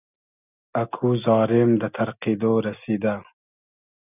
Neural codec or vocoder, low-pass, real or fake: none; 3.6 kHz; real